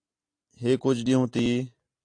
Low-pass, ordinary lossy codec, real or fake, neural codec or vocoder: 9.9 kHz; AAC, 48 kbps; real; none